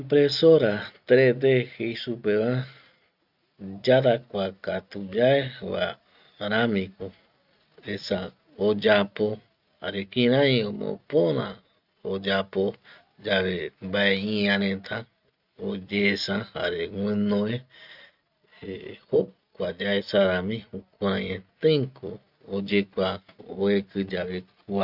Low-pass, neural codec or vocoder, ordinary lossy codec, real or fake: 5.4 kHz; none; none; real